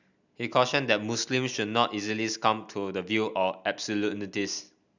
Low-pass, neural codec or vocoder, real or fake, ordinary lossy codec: 7.2 kHz; none; real; none